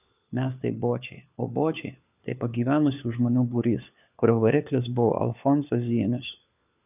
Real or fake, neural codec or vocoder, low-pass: fake; codec, 16 kHz, 4 kbps, FunCodec, trained on LibriTTS, 50 frames a second; 3.6 kHz